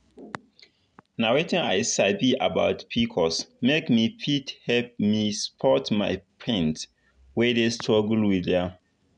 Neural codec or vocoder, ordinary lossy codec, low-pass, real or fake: none; none; 9.9 kHz; real